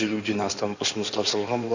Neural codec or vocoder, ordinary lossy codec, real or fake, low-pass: codec, 16 kHz in and 24 kHz out, 2.2 kbps, FireRedTTS-2 codec; none; fake; 7.2 kHz